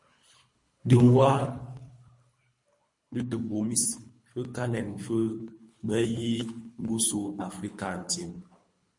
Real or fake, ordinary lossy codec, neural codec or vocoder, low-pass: fake; MP3, 48 kbps; codec, 24 kHz, 3 kbps, HILCodec; 10.8 kHz